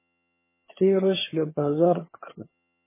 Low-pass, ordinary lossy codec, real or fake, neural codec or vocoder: 3.6 kHz; MP3, 16 kbps; fake; vocoder, 22.05 kHz, 80 mel bands, HiFi-GAN